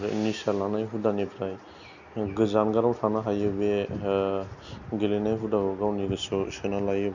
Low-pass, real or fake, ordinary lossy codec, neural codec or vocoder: 7.2 kHz; real; none; none